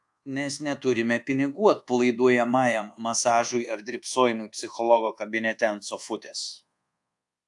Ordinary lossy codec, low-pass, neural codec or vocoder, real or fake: AAC, 64 kbps; 10.8 kHz; codec, 24 kHz, 1.2 kbps, DualCodec; fake